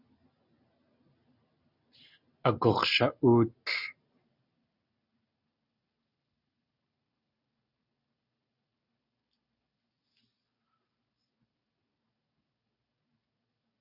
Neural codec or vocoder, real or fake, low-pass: none; real; 5.4 kHz